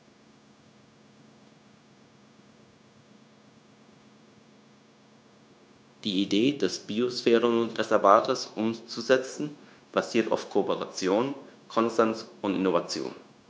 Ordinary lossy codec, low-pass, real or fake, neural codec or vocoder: none; none; fake; codec, 16 kHz, 0.9 kbps, LongCat-Audio-Codec